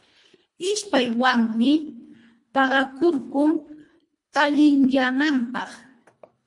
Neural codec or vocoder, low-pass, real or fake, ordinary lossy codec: codec, 24 kHz, 1.5 kbps, HILCodec; 10.8 kHz; fake; MP3, 48 kbps